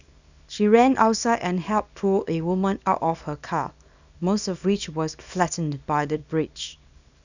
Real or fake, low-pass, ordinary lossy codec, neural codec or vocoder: fake; 7.2 kHz; none; codec, 24 kHz, 0.9 kbps, WavTokenizer, small release